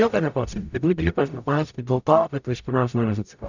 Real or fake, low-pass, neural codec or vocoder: fake; 7.2 kHz; codec, 44.1 kHz, 0.9 kbps, DAC